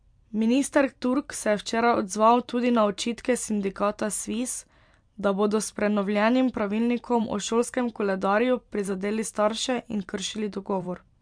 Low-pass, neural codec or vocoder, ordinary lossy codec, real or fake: 9.9 kHz; vocoder, 44.1 kHz, 128 mel bands every 512 samples, BigVGAN v2; MP3, 64 kbps; fake